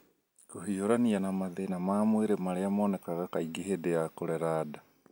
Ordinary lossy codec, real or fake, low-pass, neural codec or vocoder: none; real; 19.8 kHz; none